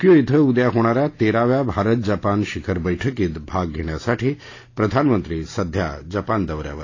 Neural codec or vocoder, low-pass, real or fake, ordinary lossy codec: none; 7.2 kHz; real; AAC, 32 kbps